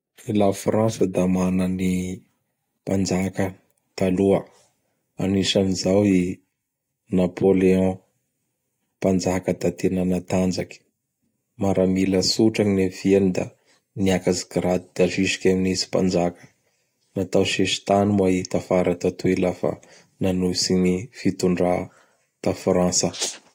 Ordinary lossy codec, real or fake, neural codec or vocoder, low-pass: AAC, 48 kbps; real; none; 19.8 kHz